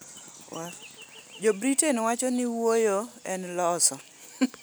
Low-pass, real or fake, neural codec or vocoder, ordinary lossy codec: none; real; none; none